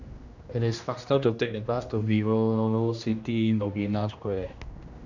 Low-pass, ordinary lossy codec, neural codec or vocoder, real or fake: 7.2 kHz; none; codec, 16 kHz, 1 kbps, X-Codec, HuBERT features, trained on general audio; fake